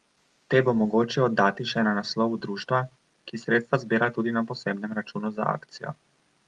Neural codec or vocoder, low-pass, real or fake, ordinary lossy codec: none; 10.8 kHz; real; Opus, 32 kbps